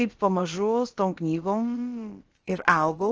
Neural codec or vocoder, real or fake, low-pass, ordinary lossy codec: codec, 16 kHz, about 1 kbps, DyCAST, with the encoder's durations; fake; 7.2 kHz; Opus, 16 kbps